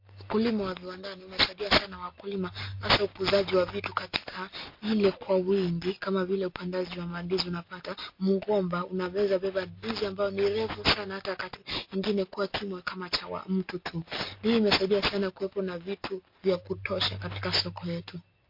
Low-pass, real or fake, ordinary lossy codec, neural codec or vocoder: 5.4 kHz; real; MP3, 32 kbps; none